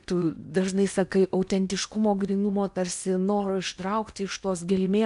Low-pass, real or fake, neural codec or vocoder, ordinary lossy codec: 10.8 kHz; fake; codec, 16 kHz in and 24 kHz out, 0.8 kbps, FocalCodec, streaming, 65536 codes; AAC, 96 kbps